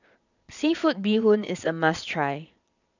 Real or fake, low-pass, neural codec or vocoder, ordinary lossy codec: fake; 7.2 kHz; vocoder, 22.05 kHz, 80 mel bands, Vocos; none